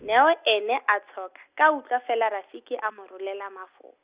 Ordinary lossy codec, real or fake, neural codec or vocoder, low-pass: Opus, 64 kbps; real; none; 3.6 kHz